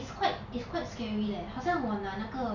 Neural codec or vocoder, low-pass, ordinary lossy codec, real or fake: none; 7.2 kHz; none; real